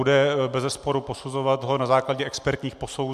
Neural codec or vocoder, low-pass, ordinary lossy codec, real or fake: none; 14.4 kHz; MP3, 96 kbps; real